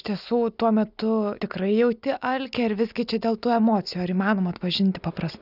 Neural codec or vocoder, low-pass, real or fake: none; 5.4 kHz; real